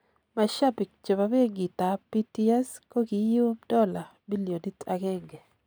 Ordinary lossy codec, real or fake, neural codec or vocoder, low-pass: none; real; none; none